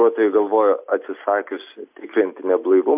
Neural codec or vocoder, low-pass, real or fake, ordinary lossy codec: none; 3.6 kHz; real; MP3, 32 kbps